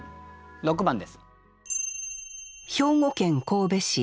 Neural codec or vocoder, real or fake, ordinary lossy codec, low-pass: none; real; none; none